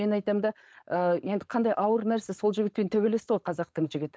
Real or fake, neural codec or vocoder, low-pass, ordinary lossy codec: fake; codec, 16 kHz, 4.8 kbps, FACodec; none; none